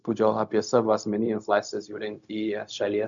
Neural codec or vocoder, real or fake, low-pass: codec, 16 kHz, 0.4 kbps, LongCat-Audio-Codec; fake; 7.2 kHz